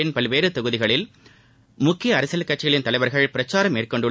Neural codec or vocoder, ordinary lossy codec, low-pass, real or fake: none; none; none; real